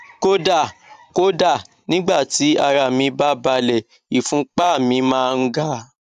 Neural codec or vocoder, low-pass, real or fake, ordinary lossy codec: vocoder, 44.1 kHz, 128 mel bands every 512 samples, BigVGAN v2; 14.4 kHz; fake; none